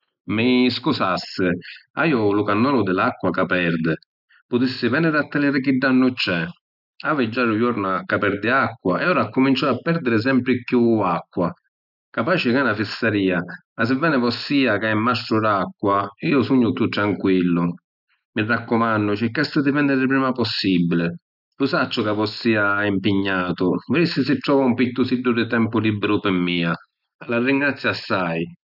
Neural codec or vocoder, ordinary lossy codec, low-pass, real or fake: none; none; 5.4 kHz; real